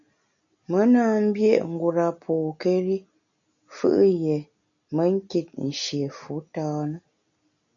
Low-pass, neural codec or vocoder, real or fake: 7.2 kHz; none; real